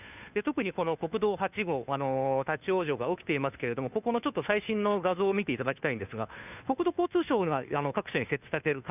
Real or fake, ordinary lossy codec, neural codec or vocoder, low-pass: fake; none; codec, 16 kHz, 2 kbps, FunCodec, trained on Chinese and English, 25 frames a second; 3.6 kHz